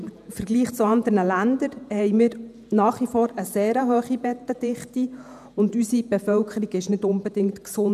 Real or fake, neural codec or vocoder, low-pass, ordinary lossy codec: fake; vocoder, 48 kHz, 128 mel bands, Vocos; 14.4 kHz; none